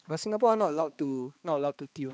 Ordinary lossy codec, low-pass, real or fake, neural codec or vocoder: none; none; fake; codec, 16 kHz, 2 kbps, X-Codec, HuBERT features, trained on balanced general audio